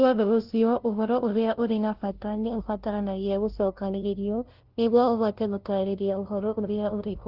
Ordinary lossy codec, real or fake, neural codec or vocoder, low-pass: Opus, 16 kbps; fake; codec, 16 kHz, 0.5 kbps, FunCodec, trained on LibriTTS, 25 frames a second; 5.4 kHz